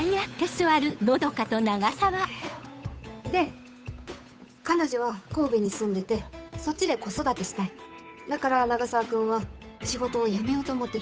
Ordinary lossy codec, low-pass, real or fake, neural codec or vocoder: none; none; fake; codec, 16 kHz, 8 kbps, FunCodec, trained on Chinese and English, 25 frames a second